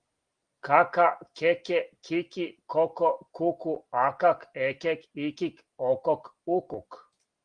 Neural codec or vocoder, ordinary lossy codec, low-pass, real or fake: none; Opus, 24 kbps; 9.9 kHz; real